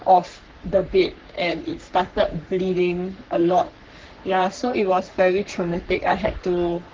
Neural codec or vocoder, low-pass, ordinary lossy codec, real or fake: codec, 44.1 kHz, 3.4 kbps, Pupu-Codec; 7.2 kHz; Opus, 16 kbps; fake